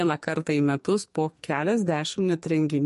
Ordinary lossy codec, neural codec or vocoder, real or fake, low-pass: MP3, 48 kbps; codec, 44.1 kHz, 2.6 kbps, SNAC; fake; 14.4 kHz